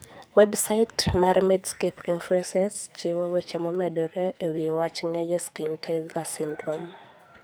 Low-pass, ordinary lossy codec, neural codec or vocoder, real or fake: none; none; codec, 44.1 kHz, 2.6 kbps, SNAC; fake